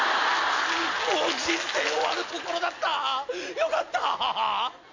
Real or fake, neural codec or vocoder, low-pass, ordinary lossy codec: real; none; 7.2 kHz; MP3, 48 kbps